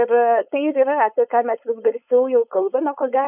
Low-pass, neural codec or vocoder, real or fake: 3.6 kHz; codec, 16 kHz, 4.8 kbps, FACodec; fake